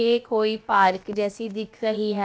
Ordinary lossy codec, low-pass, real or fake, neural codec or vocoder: none; none; fake; codec, 16 kHz, about 1 kbps, DyCAST, with the encoder's durations